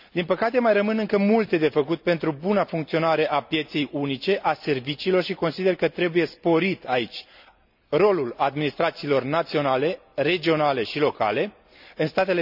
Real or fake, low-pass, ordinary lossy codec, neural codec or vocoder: real; 5.4 kHz; none; none